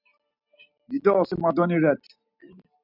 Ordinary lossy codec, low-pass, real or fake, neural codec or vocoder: AAC, 48 kbps; 5.4 kHz; real; none